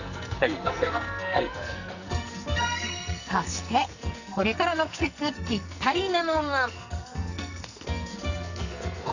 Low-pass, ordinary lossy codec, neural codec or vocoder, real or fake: 7.2 kHz; none; codec, 44.1 kHz, 2.6 kbps, SNAC; fake